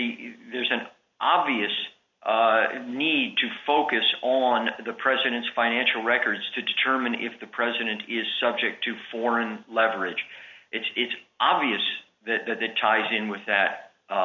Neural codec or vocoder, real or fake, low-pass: none; real; 7.2 kHz